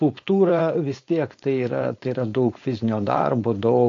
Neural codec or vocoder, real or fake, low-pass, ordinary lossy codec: codec, 16 kHz, 4.8 kbps, FACodec; fake; 7.2 kHz; AAC, 48 kbps